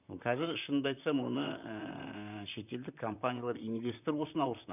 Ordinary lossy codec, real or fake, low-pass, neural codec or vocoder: none; fake; 3.6 kHz; vocoder, 44.1 kHz, 128 mel bands, Pupu-Vocoder